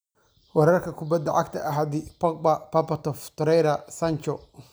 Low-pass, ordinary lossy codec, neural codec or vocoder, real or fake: none; none; none; real